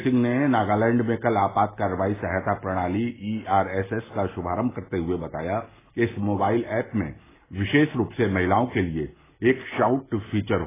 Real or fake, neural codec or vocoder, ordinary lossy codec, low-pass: real; none; AAC, 16 kbps; 3.6 kHz